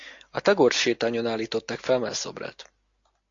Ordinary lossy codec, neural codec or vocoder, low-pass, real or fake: AAC, 48 kbps; none; 7.2 kHz; real